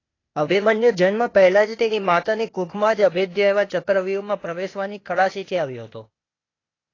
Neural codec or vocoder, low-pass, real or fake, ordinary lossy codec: codec, 16 kHz, 0.8 kbps, ZipCodec; 7.2 kHz; fake; AAC, 32 kbps